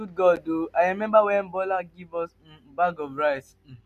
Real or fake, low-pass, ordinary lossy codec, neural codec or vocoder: real; 14.4 kHz; none; none